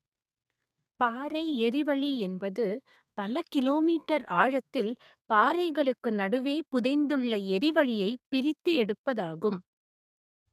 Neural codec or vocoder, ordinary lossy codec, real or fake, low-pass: codec, 32 kHz, 1.9 kbps, SNAC; none; fake; 14.4 kHz